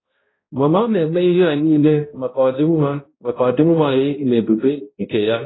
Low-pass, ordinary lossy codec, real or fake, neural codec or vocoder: 7.2 kHz; AAC, 16 kbps; fake; codec, 16 kHz, 0.5 kbps, X-Codec, HuBERT features, trained on balanced general audio